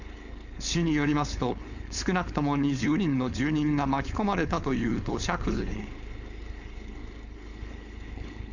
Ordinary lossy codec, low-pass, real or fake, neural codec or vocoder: none; 7.2 kHz; fake; codec, 16 kHz, 4.8 kbps, FACodec